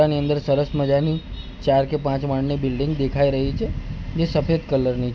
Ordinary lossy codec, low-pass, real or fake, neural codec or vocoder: none; none; real; none